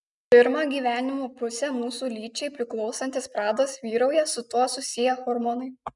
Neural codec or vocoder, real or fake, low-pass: none; real; 10.8 kHz